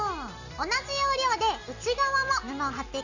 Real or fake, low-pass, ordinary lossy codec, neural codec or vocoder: real; 7.2 kHz; none; none